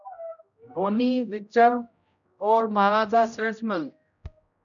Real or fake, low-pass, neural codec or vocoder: fake; 7.2 kHz; codec, 16 kHz, 0.5 kbps, X-Codec, HuBERT features, trained on general audio